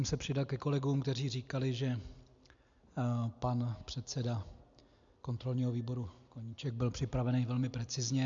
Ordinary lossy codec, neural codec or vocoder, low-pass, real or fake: MP3, 64 kbps; none; 7.2 kHz; real